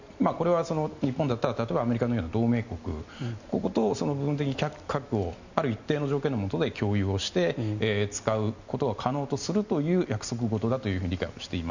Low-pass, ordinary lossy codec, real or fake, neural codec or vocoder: 7.2 kHz; none; real; none